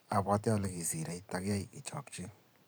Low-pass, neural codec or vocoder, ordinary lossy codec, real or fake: none; vocoder, 44.1 kHz, 128 mel bands every 512 samples, BigVGAN v2; none; fake